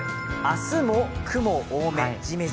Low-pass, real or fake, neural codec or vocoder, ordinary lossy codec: none; real; none; none